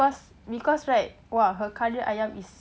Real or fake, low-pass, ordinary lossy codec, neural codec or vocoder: real; none; none; none